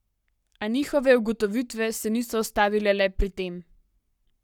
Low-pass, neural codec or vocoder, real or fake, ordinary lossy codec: 19.8 kHz; codec, 44.1 kHz, 7.8 kbps, Pupu-Codec; fake; none